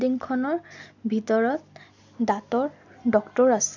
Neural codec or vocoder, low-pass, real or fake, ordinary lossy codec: none; 7.2 kHz; real; none